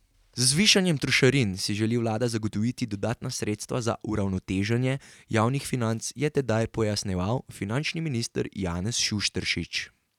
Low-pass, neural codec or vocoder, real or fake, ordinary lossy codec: 19.8 kHz; none; real; none